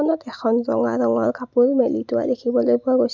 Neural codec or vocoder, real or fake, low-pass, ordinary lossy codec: none; real; 7.2 kHz; none